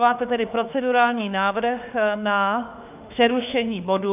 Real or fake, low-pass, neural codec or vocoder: fake; 3.6 kHz; autoencoder, 48 kHz, 32 numbers a frame, DAC-VAE, trained on Japanese speech